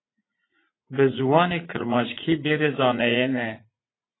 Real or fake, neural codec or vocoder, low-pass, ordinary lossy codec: fake; vocoder, 44.1 kHz, 80 mel bands, Vocos; 7.2 kHz; AAC, 16 kbps